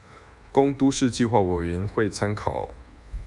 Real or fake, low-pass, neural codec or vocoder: fake; 10.8 kHz; codec, 24 kHz, 1.2 kbps, DualCodec